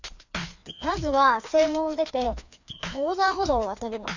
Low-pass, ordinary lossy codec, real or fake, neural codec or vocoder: 7.2 kHz; none; fake; codec, 16 kHz, 2 kbps, FreqCodec, larger model